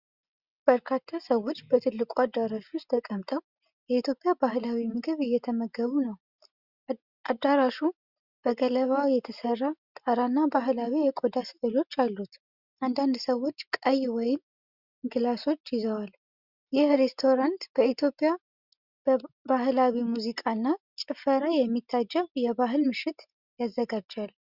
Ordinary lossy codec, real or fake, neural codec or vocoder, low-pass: Opus, 64 kbps; real; none; 5.4 kHz